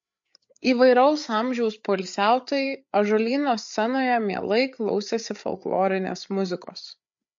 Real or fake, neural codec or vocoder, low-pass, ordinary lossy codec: fake; codec, 16 kHz, 8 kbps, FreqCodec, larger model; 7.2 kHz; MP3, 48 kbps